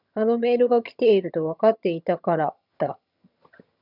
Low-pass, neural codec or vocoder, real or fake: 5.4 kHz; vocoder, 22.05 kHz, 80 mel bands, HiFi-GAN; fake